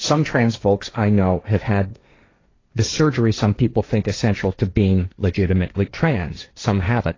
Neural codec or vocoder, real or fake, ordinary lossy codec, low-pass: codec, 16 kHz, 1.1 kbps, Voila-Tokenizer; fake; AAC, 32 kbps; 7.2 kHz